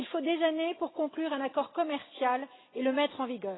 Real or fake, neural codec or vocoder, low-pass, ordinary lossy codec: real; none; 7.2 kHz; AAC, 16 kbps